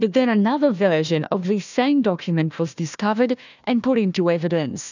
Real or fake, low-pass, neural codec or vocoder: fake; 7.2 kHz; codec, 16 kHz, 1 kbps, FunCodec, trained on Chinese and English, 50 frames a second